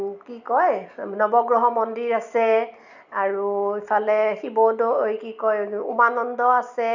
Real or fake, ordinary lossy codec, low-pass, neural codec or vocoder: real; none; 7.2 kHz; none